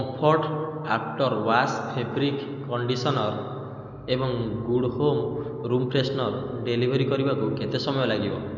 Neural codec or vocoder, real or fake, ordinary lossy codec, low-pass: none; real; none; 7.2 kHz